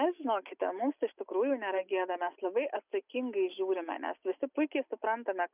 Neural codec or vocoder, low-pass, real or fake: none; 3.6 kHz; real